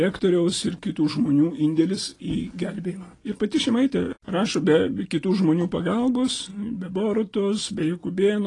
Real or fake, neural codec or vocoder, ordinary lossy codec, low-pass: real; none; AAC, 32 kbps; 10.8 kHz